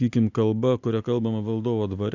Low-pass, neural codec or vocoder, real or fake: 7.2 kHz; none; real